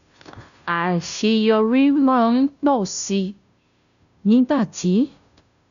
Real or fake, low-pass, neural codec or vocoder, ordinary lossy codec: fake; 7.2 kHz; codec, 16 kHz, 0.5 kbps, FunCodec, trained on Chinese and English, 25 frames a second; none